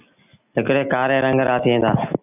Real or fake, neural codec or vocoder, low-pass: real; none; 3.6 kHz